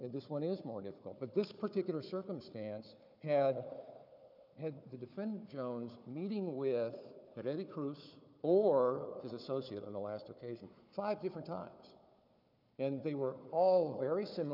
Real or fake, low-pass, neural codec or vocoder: fake; 5.4 kHz; codec, 16 kHz, 4 kbps, FunCodec, trained on Chinese and English, 50 frames a second